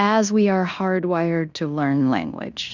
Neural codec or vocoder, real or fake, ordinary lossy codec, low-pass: codec, 16 kHz in and 24 kHz out, 0.9 kbps, LongCat-Audio-Codec, fine tuned four codebook decoder; fake; Opus, 64 kbps; 7.2 kHz